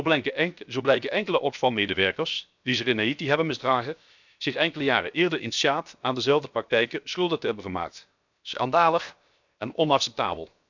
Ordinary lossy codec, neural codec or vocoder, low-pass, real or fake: none; codec, 16 kHz, 0.7 kbps, FocalCodec; 7.2 kHz; fake